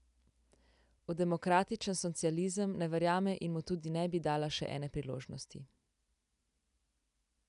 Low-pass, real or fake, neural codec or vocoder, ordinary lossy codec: 10.8 kHz; real; none; none